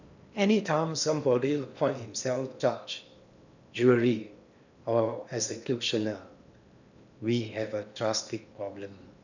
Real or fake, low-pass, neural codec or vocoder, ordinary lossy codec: fake; 7.2 kHz; codec, 16 kHz in and 24 kHz out, 0.8 kbps, FocalCodec, streaming, 65536 codes; none